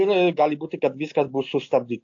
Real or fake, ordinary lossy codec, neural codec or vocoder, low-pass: real; AAC, 48 kbps; none; 7.2 kHz